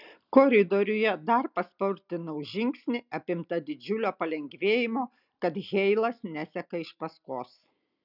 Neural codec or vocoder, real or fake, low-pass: none; real; 5.4 kHz